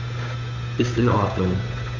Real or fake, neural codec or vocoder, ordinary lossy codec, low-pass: fake; codec, 16 kHz, 8 kbps, FunCodec, trained on Chinese and English, 25 frames a second; MP3, 48 kbps; 7.2 kHz